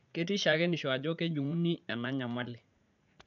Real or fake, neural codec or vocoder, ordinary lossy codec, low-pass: fake; vocoder, 44.1 kHz, 80 mel bands, Vocos; none; 7.2 kHz